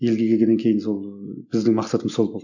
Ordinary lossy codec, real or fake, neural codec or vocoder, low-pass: none; real; none; 7.2 kHz